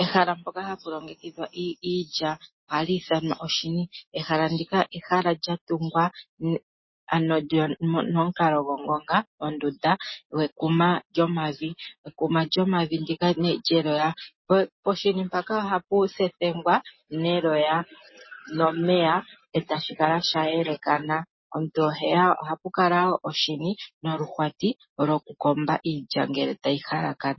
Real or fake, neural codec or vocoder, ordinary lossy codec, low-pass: real; none; MP3, 24 kbps; 7.2 kHz